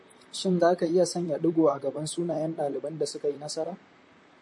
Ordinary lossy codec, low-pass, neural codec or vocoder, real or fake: MP3, 48 kbps; 10.8 kHz; vocoder, 44.1 kHz, 128 mel bands, Pupu-Vocoder; fake